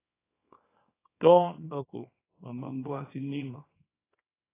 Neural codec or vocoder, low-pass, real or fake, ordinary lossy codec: codec, 24 kHz, 0.9 kbps, WavTokenizer, small release; 3.6 kHz; fake; AAC, 16 kbps